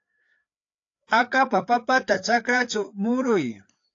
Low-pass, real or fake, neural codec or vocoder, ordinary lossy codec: 7.2 kHz; fake; codec, 16 kHz, 4 kbps, FreqCodec, larger model; AAC, 48 kbps